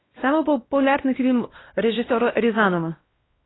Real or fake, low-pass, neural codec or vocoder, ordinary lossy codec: fake; 7.2 kHz; codec, 16 kHz, 1 kbps, X-Codec, WavLM features, trained on Multilingual LibriSpeech; AAC, 16 kbps